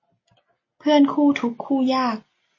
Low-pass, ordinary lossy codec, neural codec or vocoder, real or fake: 7.2 kHz; MP3, 32 kbps; none; real